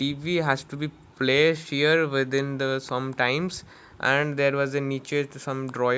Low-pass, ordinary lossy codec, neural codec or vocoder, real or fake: none; none; none; real